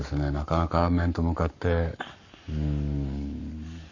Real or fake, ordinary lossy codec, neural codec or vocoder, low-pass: fake; none; codec, 44.1 kHz, 7.8 kbps, Pupu-Codec; 7.2 kHz